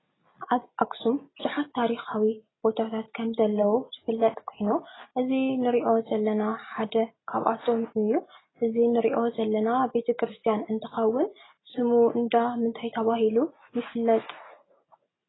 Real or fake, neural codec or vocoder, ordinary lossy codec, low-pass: real; none; AAC, 16 kbps; 7.2 kHz